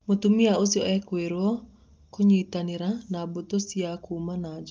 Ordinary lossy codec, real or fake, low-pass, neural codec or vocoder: Opus, 24 kbps; real; 7.2 kHz; none